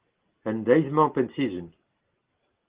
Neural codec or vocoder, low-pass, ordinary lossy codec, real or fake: none; 3.6 kHz; Opus, 16 kbps; real